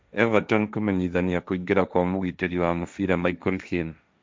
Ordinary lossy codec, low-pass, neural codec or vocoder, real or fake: none; none; codec, 16 kHz, 1.1 kbps, Voila-Tokenizer; fake